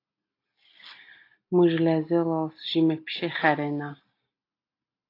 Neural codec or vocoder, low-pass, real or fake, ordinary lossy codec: none; 5.4 kHz; real; AAC, 32 kbps